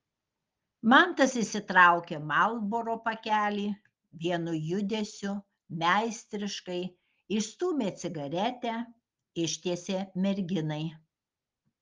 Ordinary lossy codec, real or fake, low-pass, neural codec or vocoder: Opus, 32 kbps; real; 7.2 kHz; none